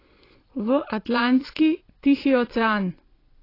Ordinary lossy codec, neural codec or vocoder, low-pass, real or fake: AAC, 24 kbps; vocoder, 22.05 kHz, 80 mel bands, Vocos; 5.4 kHz; fake